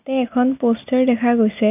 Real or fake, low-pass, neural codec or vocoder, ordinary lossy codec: real; 3.6 kHz; none; none